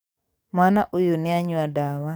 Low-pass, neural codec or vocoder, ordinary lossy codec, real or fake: none; codec, 44.1 kHz, 7.8 kbps, DAC; none; fake